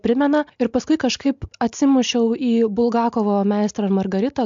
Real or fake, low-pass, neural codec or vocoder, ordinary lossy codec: real; 7.2 kHz; none; MP3, 64 kbps